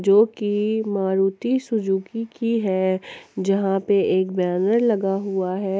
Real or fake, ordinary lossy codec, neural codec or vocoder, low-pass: real; none; none; none